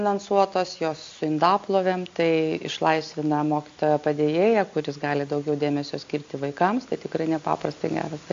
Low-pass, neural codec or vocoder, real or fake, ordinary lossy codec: 7.2 kHz; none; real; AAC, 48 kbps